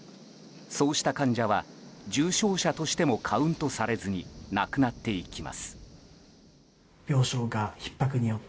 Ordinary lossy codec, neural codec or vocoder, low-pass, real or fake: none; none; none; real